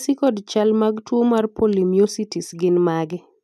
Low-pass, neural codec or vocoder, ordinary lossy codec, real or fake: 14.4 kHz; none; none; real